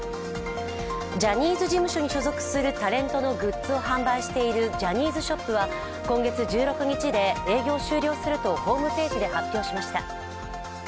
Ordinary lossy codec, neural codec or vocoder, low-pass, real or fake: none; none; none; real